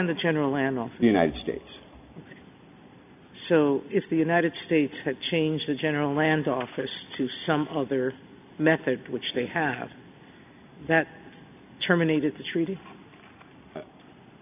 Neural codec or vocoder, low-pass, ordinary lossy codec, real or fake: none; 3.6 kHz; AAC, 32 kbps; real